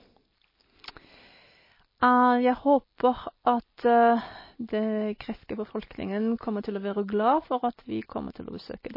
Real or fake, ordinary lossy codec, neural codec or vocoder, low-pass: real; MP3, 24 kbps; none; 5.4 kHz